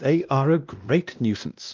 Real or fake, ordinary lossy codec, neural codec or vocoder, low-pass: fake; Opus, 24 kbps; codec, 16 kHz, 1 kbps, X-Codec, WavLM features, trained on Multilingual LibriSpeech; 7.2 kHz